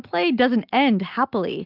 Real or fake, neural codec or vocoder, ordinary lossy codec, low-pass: real; none; Opus, 24 kbps; 5.4 kHz